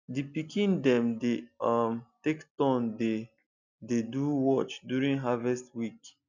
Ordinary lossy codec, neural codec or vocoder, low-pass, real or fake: none; none; 7.2 kHz; real